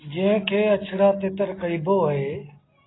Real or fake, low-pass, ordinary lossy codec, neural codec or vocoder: real; 7.2 kHz; AAC, 16 kbps; none